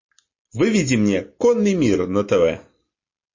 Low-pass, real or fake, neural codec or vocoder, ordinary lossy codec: 7.2 kHz; real; none; MP3, 32 kbps